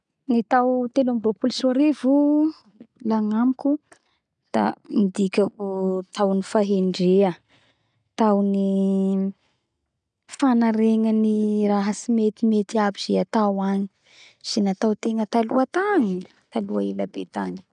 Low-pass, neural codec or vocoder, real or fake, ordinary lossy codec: 9.9 kHz; none; real; none